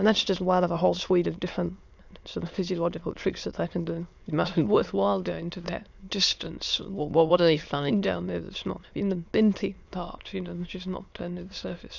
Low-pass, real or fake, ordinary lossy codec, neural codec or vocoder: 7.2 kHz; fake; Opus, 64 kbps; autoencoder, 22.05 kHz, a latent of 192 numbers a frame, VITS, trained on many speakers